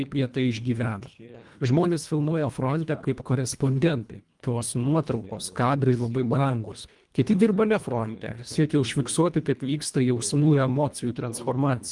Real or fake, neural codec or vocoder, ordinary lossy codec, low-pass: fake; codec, 24 kHz, 1.5 kbps, HILCodec; Opus, 32 kbps; 10.8 kHz